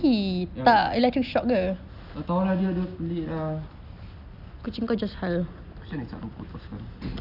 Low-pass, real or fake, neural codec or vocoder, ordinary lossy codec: 5.4 kHz; real; none; none